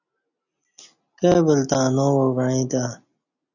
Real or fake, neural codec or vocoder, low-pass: real; none; 7.2 kHz